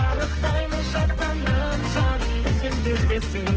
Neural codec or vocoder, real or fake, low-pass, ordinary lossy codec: codec, 32 kHz, 1.9 kbps, SNAC; fake; 7.2 kHz; Opus, 16 kbps